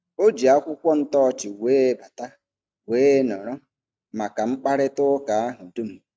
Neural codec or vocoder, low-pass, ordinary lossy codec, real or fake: none; none; none; real